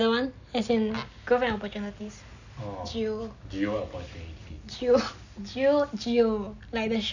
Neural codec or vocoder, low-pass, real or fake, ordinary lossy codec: none; 7.2 kHz; real; none